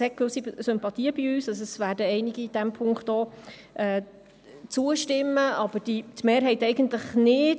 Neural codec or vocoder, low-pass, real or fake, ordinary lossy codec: none; none; real; none